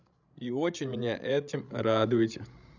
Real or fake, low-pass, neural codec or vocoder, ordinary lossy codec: fake; 7.2 kHz; codec, 16 kHz, 16 kbps, FreqCodec, larger model; none